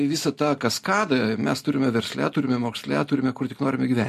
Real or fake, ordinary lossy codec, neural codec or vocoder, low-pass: real; MP3, 64 kbps; none; 14.4 kHz